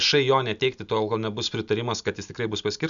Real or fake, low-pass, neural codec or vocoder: real; 7.2 kHz; none